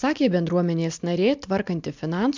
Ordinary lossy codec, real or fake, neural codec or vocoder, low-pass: MP3, 64 kbps; real; none; 7.2 kHz